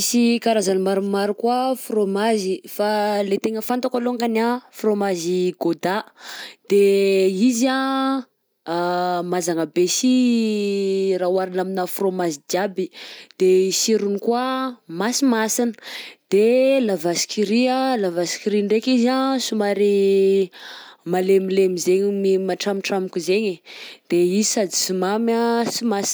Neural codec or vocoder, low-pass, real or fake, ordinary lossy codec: none; none; real; none